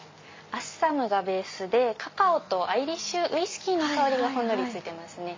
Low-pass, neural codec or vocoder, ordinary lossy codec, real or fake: 7.2 kHz; none; MP3, 32 kbps; real